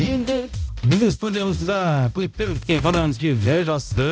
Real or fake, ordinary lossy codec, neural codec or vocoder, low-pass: fake; none; codec, 16 kHz, 0.5 kbps, X-Codec, HuBERT features, trained on balanced general audio; none